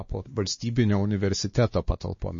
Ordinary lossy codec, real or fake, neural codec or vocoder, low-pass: MP3, 32 kbps; fake; codec, 16 kHz, 2 kbps, X-Codec, WavLM features, trained on Multilingual LibriSpeech; 7.2 kHz